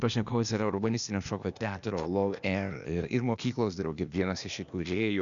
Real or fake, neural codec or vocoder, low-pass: fake; codec, 16 kHz, 0.8 kbps, ZipCodec; 7.2 kHz